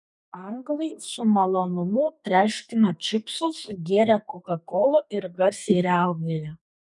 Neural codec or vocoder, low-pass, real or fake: codec, 32 kHz, 1.9 kbps, SNAC; 10.8 kHz; fake